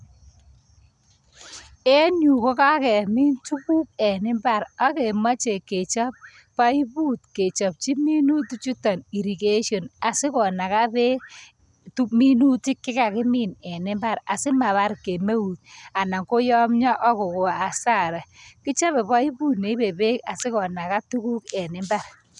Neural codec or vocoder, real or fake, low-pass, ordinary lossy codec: none; real; 10.8 kHz; none